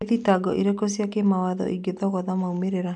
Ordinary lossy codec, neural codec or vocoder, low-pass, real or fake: none; none; 10.8 kHz; real